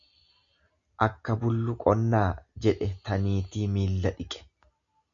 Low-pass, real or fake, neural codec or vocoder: 7.2 kHz; real; none